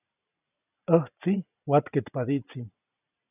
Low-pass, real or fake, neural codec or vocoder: 3.6 kHz; real; none